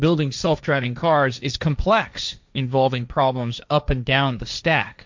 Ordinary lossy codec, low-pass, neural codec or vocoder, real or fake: MP3, 64 kbps; 7.2 kHz; codec, 16 kHz, 1.1 kbps, Voila-Tokenizer; fake